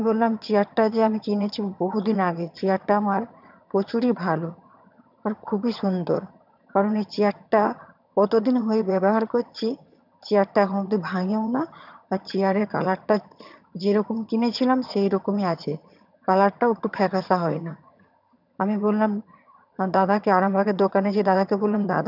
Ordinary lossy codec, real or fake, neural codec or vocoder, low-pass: none; fake; vocoder, 22.05 kHz, 80 mel bands, HiFi-GAN; 5.4 kHz